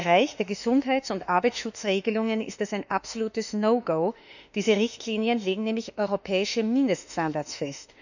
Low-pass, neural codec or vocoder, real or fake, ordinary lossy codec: 7.2 kHz; autoencoder, 48 kHz, 32 numbers a frame, DAC-VAE, trained on Japanese speech; fake; none